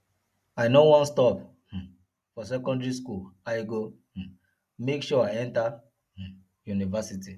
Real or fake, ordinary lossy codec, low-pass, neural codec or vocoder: real; none; 14.4 kHz; none